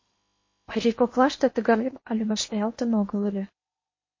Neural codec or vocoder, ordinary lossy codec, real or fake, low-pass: codec, 16 kHz in and 24 kHz out, 0.8 kbps, FocalCodec, streaming, 65536 codes; MP3, 32 kbps; fake; 7.2 kHz